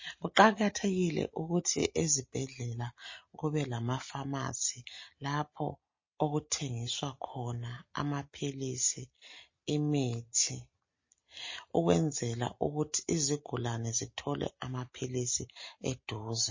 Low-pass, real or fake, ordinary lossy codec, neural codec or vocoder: 7.2 kHz; real; MP3, 32 kbps; none